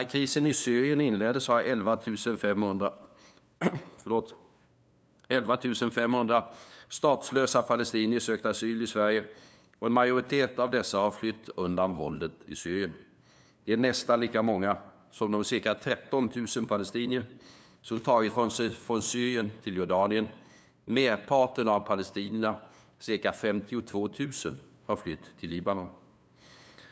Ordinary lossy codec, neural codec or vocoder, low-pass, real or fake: none; codec, 16 kHz, 2 kbps, FunCodec, trained on LibriTTS, 25 frames a second; none; fake